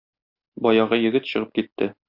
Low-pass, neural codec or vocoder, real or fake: 5.4 kHz; none; real